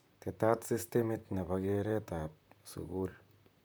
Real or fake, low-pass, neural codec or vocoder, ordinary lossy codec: fake; none; vocoder, 44.1 kHz, 128 mel bands, Pupu-Vocoder; none